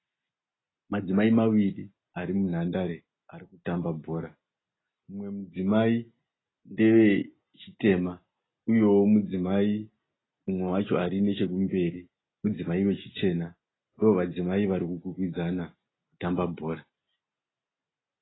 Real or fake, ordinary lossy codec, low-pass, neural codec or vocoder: real; AAC, 16 kbps; 7.2 kHz; none